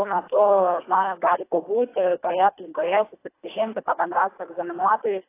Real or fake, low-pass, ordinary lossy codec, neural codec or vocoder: fake; 3.6 kHz; AAC, 24 kbps; codec, 24 kHz, 1.5 kbps, HILCodec